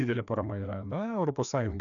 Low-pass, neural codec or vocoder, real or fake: 7.2 kHz; codec, 16 kHz, 2 kbps, FreqCodec, larger model; fake